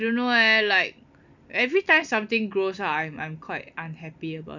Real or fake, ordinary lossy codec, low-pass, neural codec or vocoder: real; none; 7.2 kHz; none